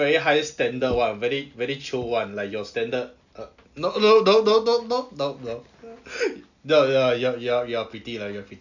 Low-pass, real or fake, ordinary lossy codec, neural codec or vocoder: 7.2 kHz; real; none; none